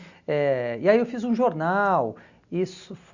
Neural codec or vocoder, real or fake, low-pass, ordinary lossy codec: none; real; 7.2 kHz; Opus, 64 kbps